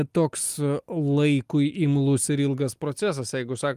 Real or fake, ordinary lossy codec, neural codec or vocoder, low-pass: real; Opus, 32 kbps; none; 14.4 kHz